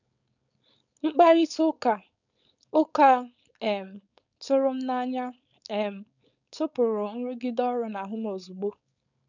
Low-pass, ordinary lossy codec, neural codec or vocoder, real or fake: 7.2 kHz; none; codec, 16 kHz, 4.8 kbps, FACodec; fake